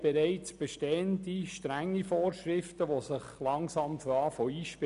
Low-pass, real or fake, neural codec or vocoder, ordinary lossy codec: 10.8 kHz; real; none; none